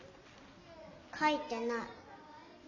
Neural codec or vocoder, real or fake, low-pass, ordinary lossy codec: none; real; 7.2 kHz; none